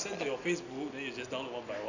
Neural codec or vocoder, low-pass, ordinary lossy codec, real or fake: none; 7.2 kHz; none; real